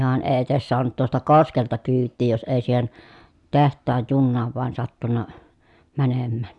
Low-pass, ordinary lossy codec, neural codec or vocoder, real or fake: 10.8 kHz; MP3, 96 kbps; none; real